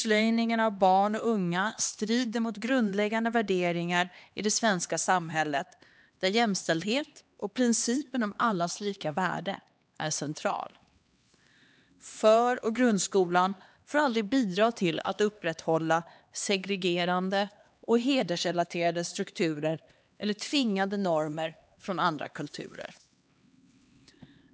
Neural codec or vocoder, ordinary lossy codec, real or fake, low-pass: codec, 16 kHz, 2 kbps, X-Codec, HuBERT features, trained on LibriSpeech; none; fake; none